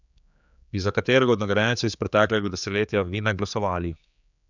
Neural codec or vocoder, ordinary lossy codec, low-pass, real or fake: codec, 16 kHz, 4 kbps, X-Codec, HuBERT features, trained on general audio; none; 7.2 kHz; fake